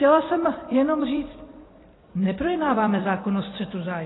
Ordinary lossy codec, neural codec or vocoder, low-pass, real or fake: AAC, 16 kbps; vocoder, 44.1 kHz, 128 mel bands every 256 samples, BigVGAN v2; 7.2 kHz; fake